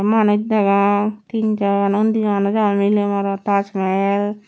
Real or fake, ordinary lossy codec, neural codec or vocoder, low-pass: real; none; none; none